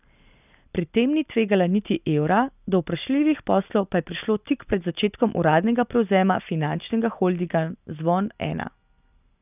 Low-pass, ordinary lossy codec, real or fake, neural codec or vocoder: 3.6 kHz; none; real; none